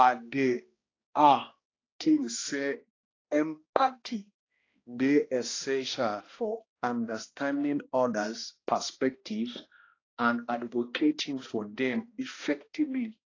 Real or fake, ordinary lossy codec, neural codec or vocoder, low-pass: fake; AAC, 32 kbps; codec, 16 kHz, 1 kbps, X-Codec, HuBERT features, trained on balanced general audio; 7.2 kHz